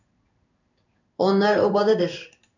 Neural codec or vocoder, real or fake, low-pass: codec, 16 kHz in and 24 kHz out, 1 kbps, XY-Tokenizer; fake; 7.2 kHz